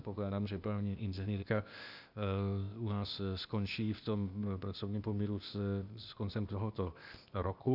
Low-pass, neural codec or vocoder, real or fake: 5.4 kHz; codec, 16 kHz, 0.8 kbps, ZipCodec; fake